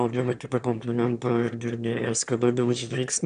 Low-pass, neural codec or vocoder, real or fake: 9.9 kHz; autoencoder, 22.05 kHz, a latent of 192 numbers a frame, VITS, trained on one speaker; fake